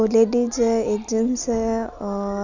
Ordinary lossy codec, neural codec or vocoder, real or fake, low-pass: none; none; real; 7.2 kHz